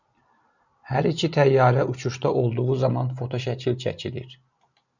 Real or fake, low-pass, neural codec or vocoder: real; 7.2 kHz; none